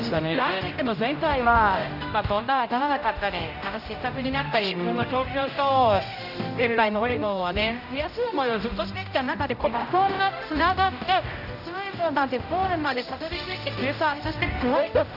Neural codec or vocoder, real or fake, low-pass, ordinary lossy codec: codec, 16 kHz, 0.5 kbps, X-Codec, HuBERT features, trained on general audio; fake; 5.4 kHz; none